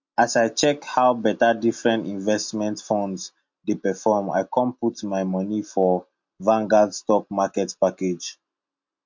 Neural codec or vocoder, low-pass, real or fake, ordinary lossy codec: none; 7.2 kHz; real; MP3, 48 kbps